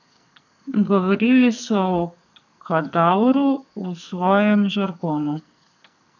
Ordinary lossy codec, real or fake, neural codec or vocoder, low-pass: none; fake; codec, 44.1 kHz, 2.6 kbps, SNAC; 7.2 kHz